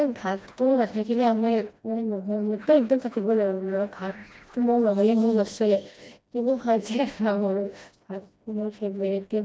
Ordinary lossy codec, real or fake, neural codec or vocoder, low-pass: none; fake; codec, 16 kHz, 1 kbps, FreqCodec, smaller model; none